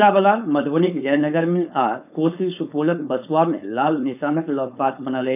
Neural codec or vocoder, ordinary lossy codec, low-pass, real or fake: codec, 16 kHz, 4.8 kbps, FACodec; none; 3.6 kHz; fake